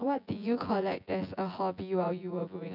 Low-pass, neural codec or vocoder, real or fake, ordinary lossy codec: 5.4 kHz; vocoder, 24 kHz, 100 mel bands, Vocos; fake; none